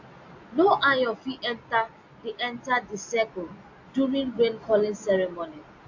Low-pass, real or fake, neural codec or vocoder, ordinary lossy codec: 7.2 kHz; real; none; AAC, 48 kbps